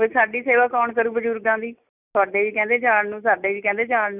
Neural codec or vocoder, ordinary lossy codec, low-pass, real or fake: none; none; 3.6 kHz; real